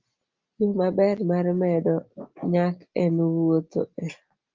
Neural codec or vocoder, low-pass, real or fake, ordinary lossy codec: none; 7.2 kHz; real; Opus, 32 kbps